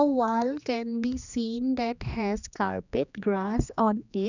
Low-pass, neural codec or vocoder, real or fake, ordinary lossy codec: 7.2 kHz; codec, 16 kHz, 2 kbps, X-Codec, HuBERT features, trained on general audio; fake; none